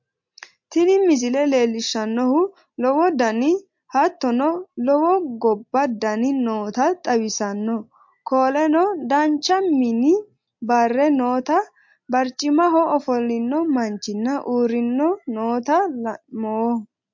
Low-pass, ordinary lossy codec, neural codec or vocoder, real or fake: 7.2 kHz; MP3, 48 kbps; none; real